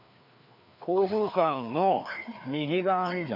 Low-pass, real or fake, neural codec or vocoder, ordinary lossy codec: 5.4 kHz; fake; codec, 16 kHz, 2 kbps, FreqCodec, larger model; none